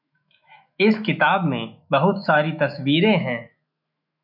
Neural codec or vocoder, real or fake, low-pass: autoencoder, 48 kHz, 128 numbers a frame, DAC-VAE, trained on Japanese speech; fake; 5.4 kHz